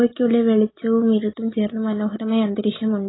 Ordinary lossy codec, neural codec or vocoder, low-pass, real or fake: AAC, 16 kbps; none; 7.2 kHz; real